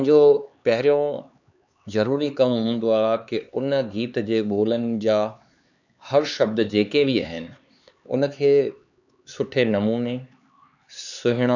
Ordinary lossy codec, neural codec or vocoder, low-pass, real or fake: none; codec, 16 kHz, 4 kbps, X-Codec, HuBERT features, trained on LibriSpeech; 7.2 kHz; fake